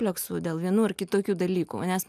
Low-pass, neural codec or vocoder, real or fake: 14.4 kHz; none; real